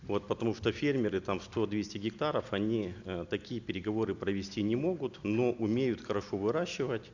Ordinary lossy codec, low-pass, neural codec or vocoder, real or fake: none; 7.2 kHz; none; real